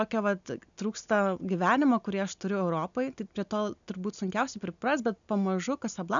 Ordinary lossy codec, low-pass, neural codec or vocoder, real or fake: MP3, 96 kbps; 7.2 kHz; none; real